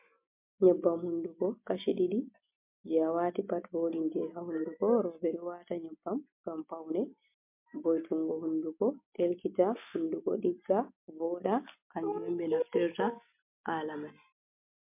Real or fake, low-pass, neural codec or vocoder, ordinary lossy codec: real; 3.6 kHz; none; MP3, 32 kbps